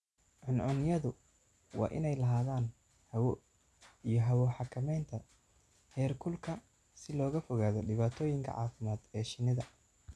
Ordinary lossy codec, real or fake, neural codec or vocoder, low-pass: none; real; none; none